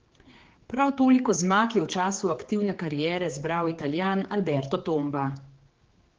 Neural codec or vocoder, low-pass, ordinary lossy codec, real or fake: codec, 16 kHz, 4 kbps, X-Codec, HuBERT features, trained on general audio; 7.2 kHz; Opus, 16 kbps; fake